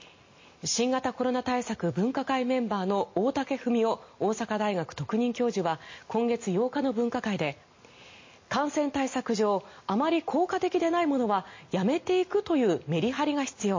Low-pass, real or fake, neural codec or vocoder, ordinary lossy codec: 7.2 kHz; real; none; MP3, 32 kbps